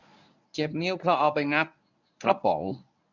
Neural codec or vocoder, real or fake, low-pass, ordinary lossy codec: codec, 24 kHz, 0.9 kbps, WavTokenizer, medium speech release version 1; fake; 7.2 kHz; none